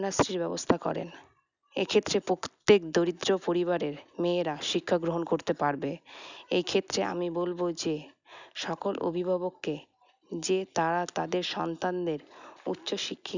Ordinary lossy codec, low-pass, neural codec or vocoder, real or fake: none; 7.2 kHz; none; real